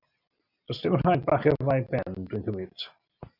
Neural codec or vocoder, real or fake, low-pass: none; real; 5.4 kHz